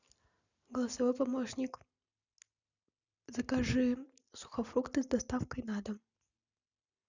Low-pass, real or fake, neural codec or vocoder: 7.2 kHz; real; none